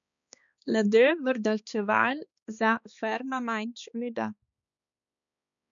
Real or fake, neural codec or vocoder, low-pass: fake; codec, 16 kHz, 2 kbps, X-Codec, HuBERT features, trained on balanced general audio; 7.2 kHz